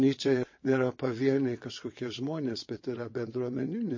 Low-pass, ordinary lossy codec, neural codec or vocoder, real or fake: 7.2 kHz; MP3, 32 kbps; vocoder, 22.05 kHz, 80 mel bands, WaveNeXt; fake